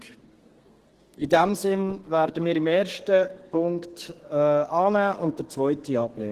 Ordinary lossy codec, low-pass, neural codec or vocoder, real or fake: Opus, 16 kbps; 14.4 kHz; codec, 32 kHz, 1.9 kbps, SNAC; fake